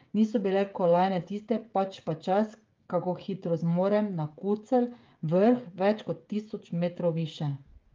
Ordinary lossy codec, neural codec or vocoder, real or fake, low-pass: Opus, 32 kbps; codec, 16 kHz, 16 kbps, FreqCodec, smaller model; fake; 7.2 kHz